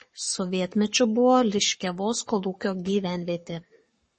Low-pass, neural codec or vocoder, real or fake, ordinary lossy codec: 10.8 kHz; codec, 44.1 kHz, 7.8 kbps, Pupu-Codec; fake; MP3, 32 kbps